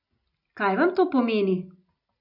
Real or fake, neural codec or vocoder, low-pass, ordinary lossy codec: real; none; 5.4 kHz; none